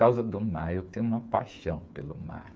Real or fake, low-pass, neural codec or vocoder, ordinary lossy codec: fake; none; codec, 16 kHz, 8 kbps, FreqCodec, smaller model; none